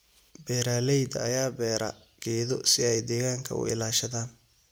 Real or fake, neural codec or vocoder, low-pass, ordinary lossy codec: real; none; none; none